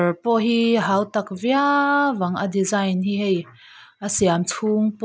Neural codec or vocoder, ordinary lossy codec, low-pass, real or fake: none; none; none; real